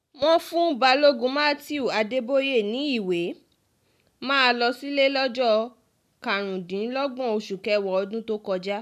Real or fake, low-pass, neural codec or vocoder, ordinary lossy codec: real; 14.4 kHz; none; none